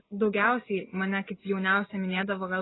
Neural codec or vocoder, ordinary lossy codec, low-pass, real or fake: none; AAC, 16 kbps; 7.2 kHz; real